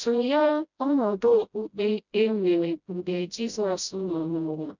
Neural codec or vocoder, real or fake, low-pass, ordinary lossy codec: codec, 16 kHz, 0.5 kbps, FreqCodec, smaller model; fake; 7.2 kHz; none